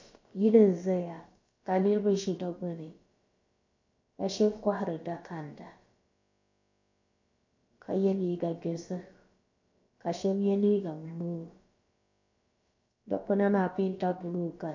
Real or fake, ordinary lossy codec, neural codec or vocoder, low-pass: fake; AAC, 48 kbps; codec, 16 kHz, about 1 kbps, DyCAST, with the encoder's durations; 7.2 kHz